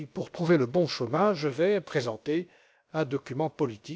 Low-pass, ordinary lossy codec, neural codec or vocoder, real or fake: none; none; codec, 16 kHz, about 1 kbps, DyCAST, with the encoder's durations; fake